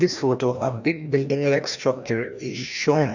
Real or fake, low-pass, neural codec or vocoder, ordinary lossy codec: fake; 7.2 kHz; codec, 16 kHz, 1 kbps, FreqCodec, larger model; none